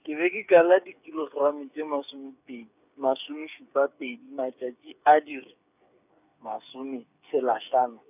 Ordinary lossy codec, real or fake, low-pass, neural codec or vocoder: none; fake; 3.6 kHz; codec, 16 kHz, 8 kbps, FreqCodec, smaller model